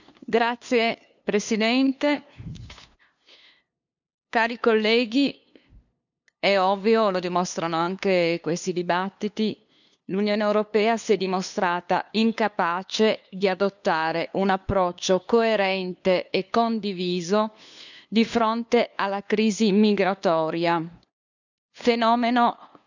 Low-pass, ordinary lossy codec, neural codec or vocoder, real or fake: 7.2 kHz; none; codec, 16 kHz, 2 kbps, FunCodec, trained on LibriTTS, 25 frames a second; fake